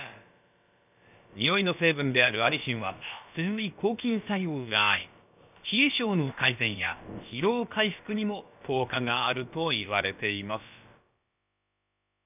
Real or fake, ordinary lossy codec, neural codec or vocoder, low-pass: fake; none; codec, 16 kHz, about 1 kbps, DyCAST, with the encoder's durations; 3.6 kHz